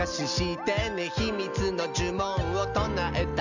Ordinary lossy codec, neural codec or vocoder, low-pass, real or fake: none; none; 7.2 kHz; real